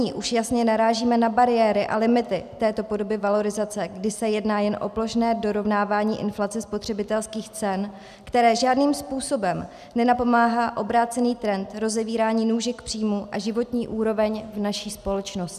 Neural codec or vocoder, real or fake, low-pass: none; real; 14.4 kHz